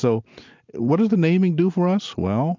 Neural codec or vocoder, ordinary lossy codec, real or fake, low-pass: none; MP3, 64 kbps; real; 7.2 kHz